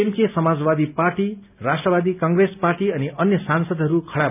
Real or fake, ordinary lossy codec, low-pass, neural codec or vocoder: real; none; 3.6 kHz; none